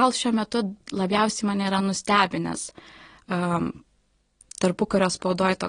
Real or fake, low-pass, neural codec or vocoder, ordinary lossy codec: real; 9.9 kHz; none; AAC, 32 kbps